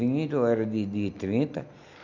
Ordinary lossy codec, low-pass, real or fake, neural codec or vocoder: none; 7.2 kHz; real; none